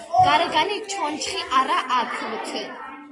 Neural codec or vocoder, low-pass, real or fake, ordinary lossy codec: none; 10.8 kHz; real; AAC, 32 kbps